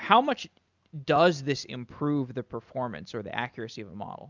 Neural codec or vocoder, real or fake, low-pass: none; real; 7.2 kHz